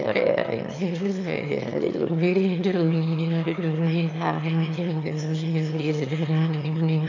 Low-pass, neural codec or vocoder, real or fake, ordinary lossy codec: 7.2 kHz; autoencoder, 22.05 kHz, a latent of 192 numbers a frame, VITS, trained on one speaker; fake; AAC, 48 kbps